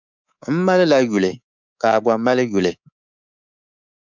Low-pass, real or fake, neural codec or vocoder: 7.2 kHz; fake; codec, 24 kHz, 3.1 kbps, DualCodec